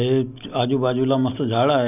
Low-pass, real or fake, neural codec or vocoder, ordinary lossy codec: 3.6 kHz; real; none; none